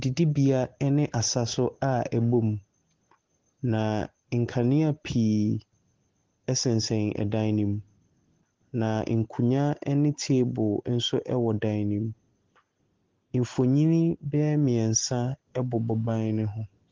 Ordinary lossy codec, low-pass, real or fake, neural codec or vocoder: Opus, 16 kbps; 7.2 kHz; real; none